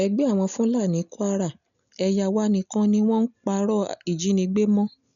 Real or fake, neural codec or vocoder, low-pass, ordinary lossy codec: real; none; 7.2 kHz; none